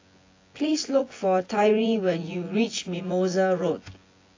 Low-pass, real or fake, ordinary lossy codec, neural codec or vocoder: 7.2 kHz; fake; AAC, 32 kbps; vocoder, 24 kHz, 100 mel bands, Vocos